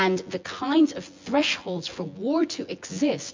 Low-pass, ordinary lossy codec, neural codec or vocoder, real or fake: 7.2 kHz; MP3, 64 kbps; vocoder, 24 kHz, 100 mel bands, Vocos; fake